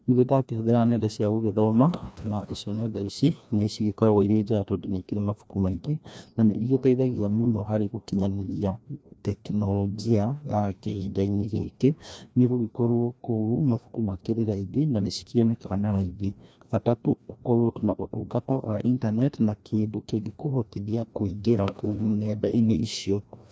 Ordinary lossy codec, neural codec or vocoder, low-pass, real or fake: none; codec, 16 kHz, 1 kbps, FreqCodec, larger model; none; fake